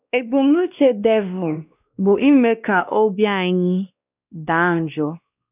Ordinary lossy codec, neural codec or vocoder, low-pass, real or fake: none; codec, 16 kHz, 1 kbps, X-Codec, WavLM features, trained on Multilingual LibriSpeech; 3.6 kHz; fake